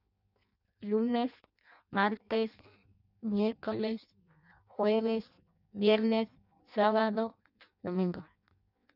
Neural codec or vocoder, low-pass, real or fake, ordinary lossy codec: codec, 16 kHz in and 24 kHz out, 0.6 kbps, FireRedTTS-2 codec; 5.4 kHz; fake; none